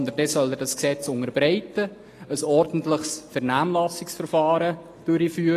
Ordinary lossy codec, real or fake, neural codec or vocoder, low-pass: AAC, 48 kbps; real; none; 14.4 kHz